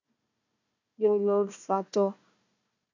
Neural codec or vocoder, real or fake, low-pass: codec, 16 kHz, 1 kbps, FunCodec, trained on Chinese and English, 50 frames a second; fake; 7.2 kHz